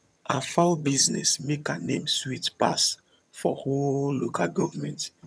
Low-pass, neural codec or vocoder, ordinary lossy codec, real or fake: none; vocoder, 22.05 kHz, 80 mel bands, HiFi-GAN; none; fake